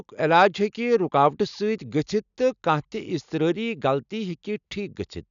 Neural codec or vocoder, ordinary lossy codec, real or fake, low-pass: none; none; real; 7.2 kHz